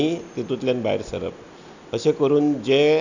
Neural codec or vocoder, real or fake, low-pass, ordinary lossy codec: none; real; 7.2 kHz; none